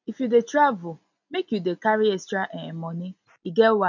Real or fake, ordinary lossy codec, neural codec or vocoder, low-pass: real; none; none; 7.2 kHz